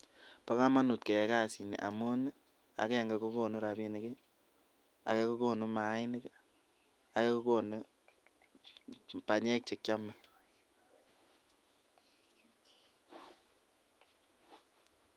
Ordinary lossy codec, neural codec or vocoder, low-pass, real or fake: Opus, 32 kbps; autoencoder, 48 kHz, 128 numbers a frame, DAC-VAE, trained on Japanese speech; 19.8 kHz; fake